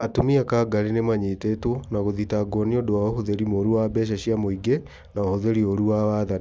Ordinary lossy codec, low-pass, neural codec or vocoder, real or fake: none; none; none; real